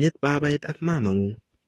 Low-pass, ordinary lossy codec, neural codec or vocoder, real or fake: 19.8 kHz; AAC, 32 kbps; autoencoder, 48 kHz, 32 numbers a frame, DAC-VAE, trained on Japanese speech; fake